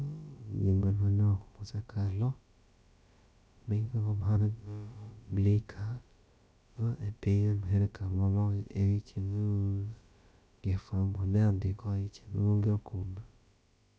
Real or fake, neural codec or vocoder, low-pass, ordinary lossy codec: fake; codec, 16 kHz, about 1 kbps, DyCAST, with the encoder's durations; none; none